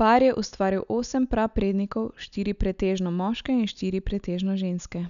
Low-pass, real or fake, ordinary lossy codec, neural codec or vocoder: 7.2 kHz; real; none; none